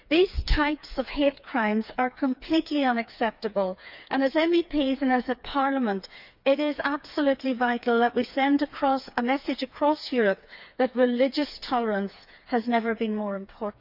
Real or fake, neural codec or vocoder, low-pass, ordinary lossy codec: fake; codec, 16 kHz, 4 kbps, FreqCodec, smaller model; 5.4 kHz; none